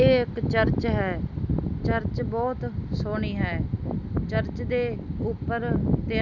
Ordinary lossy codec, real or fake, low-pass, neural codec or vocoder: none; real; 7.2 kHz; none